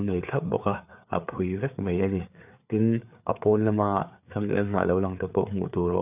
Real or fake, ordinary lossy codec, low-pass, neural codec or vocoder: fake; none; 3.6 kHz; codec, 16 kHz, 4 kbps, FreqCodec, larger model